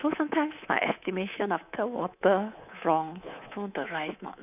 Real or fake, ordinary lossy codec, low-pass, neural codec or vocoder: fake; none; 3.6 kHz; codec, 24 kHz, 3.1 kbps, DualCodec